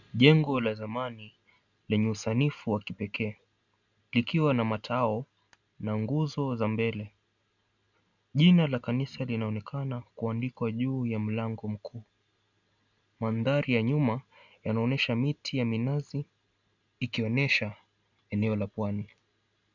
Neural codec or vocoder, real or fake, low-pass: none; real; 7.2 kHz